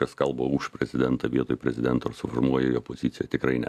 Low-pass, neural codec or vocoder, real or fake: 14.4 kHz; none; real